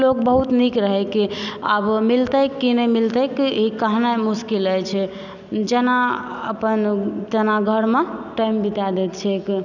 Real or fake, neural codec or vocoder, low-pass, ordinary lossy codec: real; none; 7.2 kHz; none